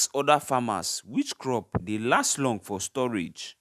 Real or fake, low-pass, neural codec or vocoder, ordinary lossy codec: real; 14.4 kHz; none; none